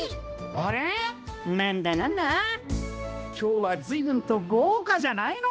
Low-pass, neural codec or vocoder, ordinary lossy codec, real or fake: none; codec, 16 kHz, 1 kbps, X-Codec, HuBERT features, trained on balanced general audio; none; fake